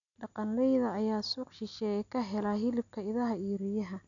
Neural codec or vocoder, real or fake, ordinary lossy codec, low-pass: none; real; none; 7.2 kHz